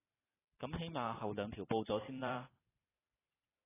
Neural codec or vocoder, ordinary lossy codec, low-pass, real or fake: vocoder, 44.1 kHz, 128 mel bands every 512 samples, BigVGAN v2; AAC, 16 kbps; 3.6 kHz; fake